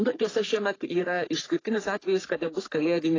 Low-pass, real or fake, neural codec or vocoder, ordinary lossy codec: 7.2 kHz; fake; codec, 44.1 kHz, 3.4 kbps, Pupu-Codec; AAC, 32 kbps